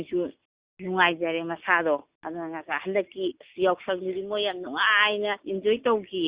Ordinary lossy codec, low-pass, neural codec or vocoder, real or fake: Opus, 64 kbps; 3.6 kHz; none; real